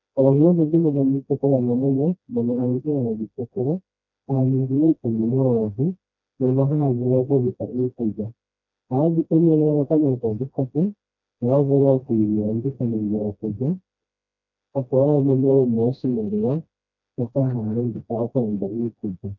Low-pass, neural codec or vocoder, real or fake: 7.2 kHz; codec, 16 kHz, 1 kbps, FreqCodec, smaller model; fake